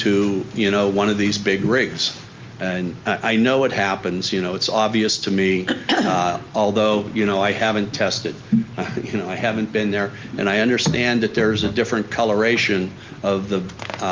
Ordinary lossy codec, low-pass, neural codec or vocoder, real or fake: Opus, 32 kbps; 7.2 kHz; none; real